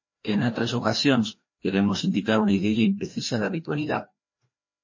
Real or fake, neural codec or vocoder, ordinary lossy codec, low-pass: fake; codec, 16 kHz, 1 kbps, FreqCodec, larger model; MP3, 32 kbps; 7.2 kHz